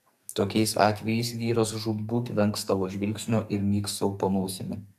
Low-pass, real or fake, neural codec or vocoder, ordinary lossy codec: 14.4 kHz; fake; codec, 44.1 kHz, 2.6 kbps, SNAC; AAC, 96 kbps